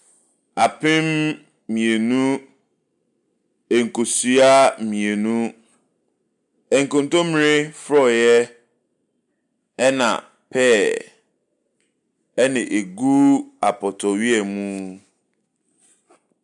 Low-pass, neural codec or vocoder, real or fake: 10.8 kHz; none; real